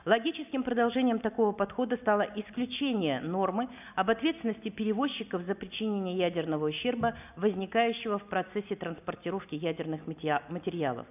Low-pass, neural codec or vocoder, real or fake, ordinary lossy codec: 3.6 kHz; none; real; none